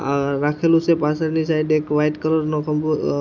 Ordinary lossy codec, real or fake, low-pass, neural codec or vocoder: none; real; 7.2 kHz; none